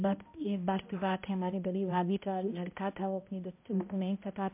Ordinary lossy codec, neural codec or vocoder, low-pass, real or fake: MP3, 32 kbps; codec, 16 kHz, 0.5 kbps, X-Codec, HuBERT features, trained on balanced general audio; 3.6 kHz; fake